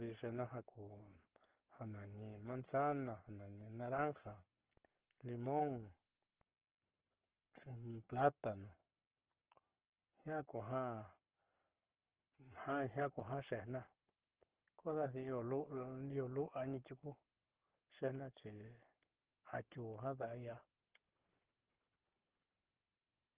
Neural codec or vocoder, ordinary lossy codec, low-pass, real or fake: codec, 44.1 kHz, 7.8 kbps, Pupu-Codec; Opus, 16 kbps; 3.6 kHz; fake